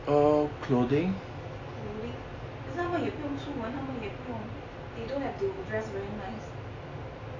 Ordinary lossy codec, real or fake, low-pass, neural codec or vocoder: AAC, 32 kbps; real; 7.2 kHz; none